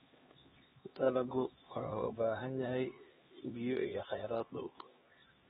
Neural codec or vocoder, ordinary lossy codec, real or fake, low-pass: codec, 16 kHz, 2 kbps, X-Codec, HuBERT features, trained on LibriSpeech; AAC, 16 kbps; fake; 7.2 kHz